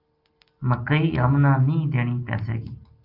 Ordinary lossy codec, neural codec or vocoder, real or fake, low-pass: Opus, 32 kbps; none; real; 5.4 kHz